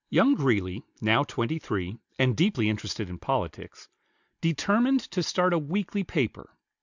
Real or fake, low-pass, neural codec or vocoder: real; 7.2 kHz; none